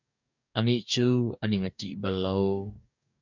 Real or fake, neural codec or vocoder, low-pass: fake; codec, 44.1 kHz, 2.6 kbps, DAC; 7.2 kHz